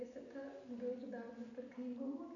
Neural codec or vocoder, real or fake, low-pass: none; real; 7.2 kHz